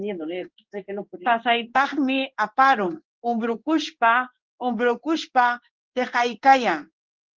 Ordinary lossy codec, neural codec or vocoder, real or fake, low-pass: Opus, 16 kbps; codec, 16 kHz in and 24 kHz out, 1 kbps, XY-Tokenizer; fake; 7.2 kHz